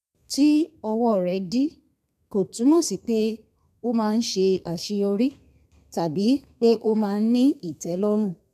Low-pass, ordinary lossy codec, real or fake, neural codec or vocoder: 14.4 kHz; MP3, 96 kbps; fake; codec, 32 kHz, 1.9 kbps, SNAC